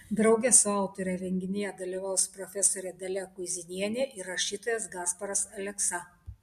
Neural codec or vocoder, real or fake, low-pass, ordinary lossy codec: none; real; 14.4 kHz; MP3, 64 kbps